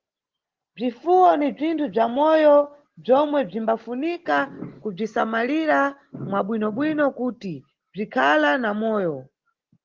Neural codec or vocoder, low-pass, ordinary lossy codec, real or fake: none; 7.2 kHz; Opus, 16 kbps; real